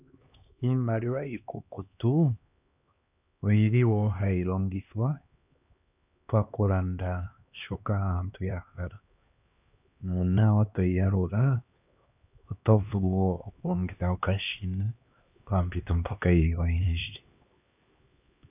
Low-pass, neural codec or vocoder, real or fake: 3.6 kHz; codec, 16 kHz, 2 kbps, X-Codec, HuBERT features, trained on LibriSpeech; fake